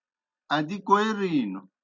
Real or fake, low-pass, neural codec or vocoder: real; 7.2 kHz; none